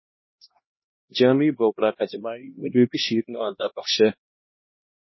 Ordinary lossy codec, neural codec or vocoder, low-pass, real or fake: MP3, 24 kbps; codec, 16 kHz, 1 kbps, X-Codec, HuBERT features, trained on LibriSpeech; 7.2 kHz; fake